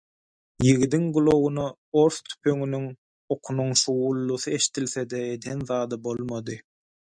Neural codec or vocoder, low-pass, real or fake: none; 9.9 kHz; real